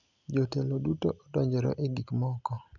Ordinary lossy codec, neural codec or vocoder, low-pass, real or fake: none; none; 7.2 kHz; real